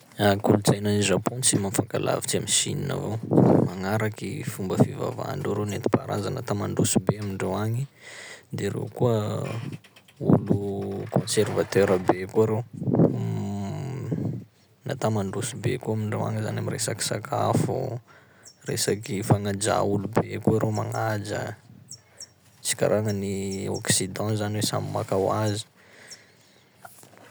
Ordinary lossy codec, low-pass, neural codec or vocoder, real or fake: none; none; none; real